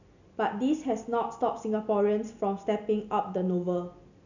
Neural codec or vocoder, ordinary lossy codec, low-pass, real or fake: none; none; 7.2 kHz; real